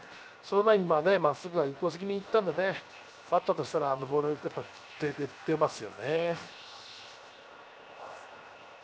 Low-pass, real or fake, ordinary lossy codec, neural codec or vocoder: none; fake; none; codec, 16 kHz, 0.7 kbps, FocalCodec